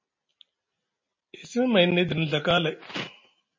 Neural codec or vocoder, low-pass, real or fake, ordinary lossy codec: none; 7.2 kHz; real; MP3, 32 kbps